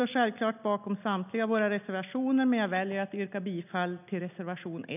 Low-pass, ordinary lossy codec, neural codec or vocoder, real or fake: 3.6 kHz; none; none; real